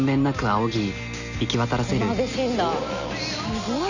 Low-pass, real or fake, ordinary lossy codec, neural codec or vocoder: 7.2 kHz; real; none; none